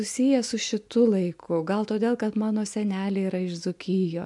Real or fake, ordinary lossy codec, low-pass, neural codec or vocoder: real; MP3, 64 kbps; 10.8 kHz; none